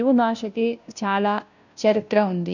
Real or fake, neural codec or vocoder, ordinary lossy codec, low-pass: fake; codec, 16 kHz, 0.5 kbps, FunCodec, trained on Chinese and English, 25 frames a second; none; 7.2 kHz